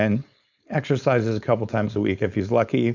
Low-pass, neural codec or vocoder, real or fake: 7.2 kHz; codec, 16 kHz, 4.8 kbps, FACodec; fake